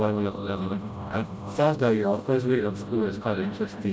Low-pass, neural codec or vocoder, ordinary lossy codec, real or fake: none; codec, 16 kHz, 0.5 kbps, FreqCodec, smaller model; none; fake